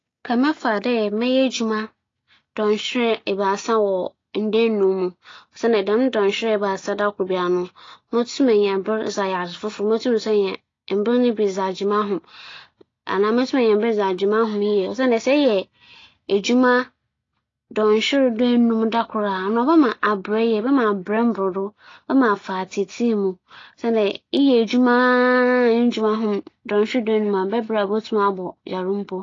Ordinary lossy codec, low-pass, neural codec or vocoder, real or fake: AAC, 32 kbps; 7.2 kHz; none; real